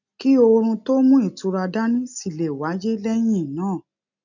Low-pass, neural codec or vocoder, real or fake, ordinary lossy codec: 7.2 kHz; none; real; none